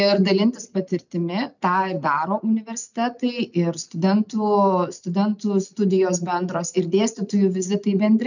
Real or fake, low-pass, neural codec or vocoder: real; 7.2 kHz; none